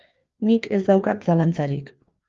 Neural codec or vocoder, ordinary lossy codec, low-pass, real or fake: codec, 16 kHz, 2 kbps, FreqCodec, larger model; Opus, 32 kbps; 7.2 kHz; fake